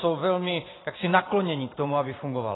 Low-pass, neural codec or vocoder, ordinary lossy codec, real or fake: 7.2 kHz; vocoder, 44.1 kHz, 128 mel bands every 512 samples, BigVGAN v2; AAC, 16 kbps; fake